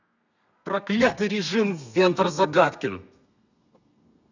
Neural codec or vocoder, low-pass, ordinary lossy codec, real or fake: codec, 32 kHz, 1.9 kbps, SNAC; 7.2 kHz; none; fake